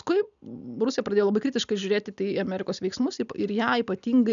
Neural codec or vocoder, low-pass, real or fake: none; 7.2 kHz; real